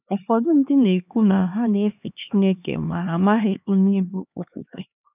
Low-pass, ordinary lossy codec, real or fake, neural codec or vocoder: 3.6 kHz; none; fake; codec, 16 kHz, 2 kbps, X-Codec, HuBERT features, trained on LibriSpeech